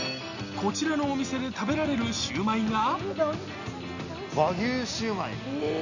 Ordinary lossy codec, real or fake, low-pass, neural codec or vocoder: none; real; 7.2 kHz; none